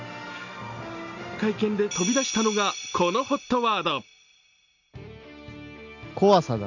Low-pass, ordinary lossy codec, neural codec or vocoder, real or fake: 7.2 kHz; none; none; real